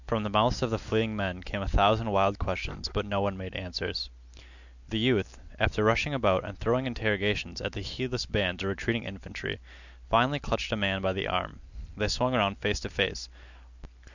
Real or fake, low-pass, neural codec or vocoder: real; 7.2 kHz; none